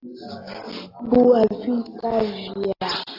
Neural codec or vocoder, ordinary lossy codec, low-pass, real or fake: none; AAC, 32 kbps; 5.4 kHz; real